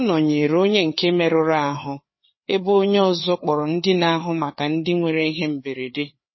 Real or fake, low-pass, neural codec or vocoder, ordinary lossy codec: fake; 7.2 kHz; codec, 16 kHz, 4 kbps, FreqCodec, larger model; MP3, 24 kbps